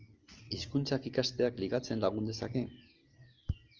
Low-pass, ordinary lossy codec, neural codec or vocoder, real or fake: 7.2 kHz; Opus, 32 kbps; none; real